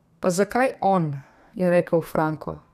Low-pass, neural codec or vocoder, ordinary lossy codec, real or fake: 14.4 kHz; codec, 32 kHz, 1.9 kbps, SNAC; none; fake